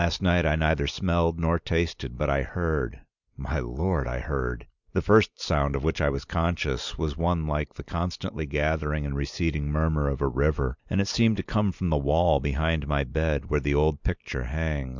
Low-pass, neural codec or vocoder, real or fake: 7.2 kHz; none; real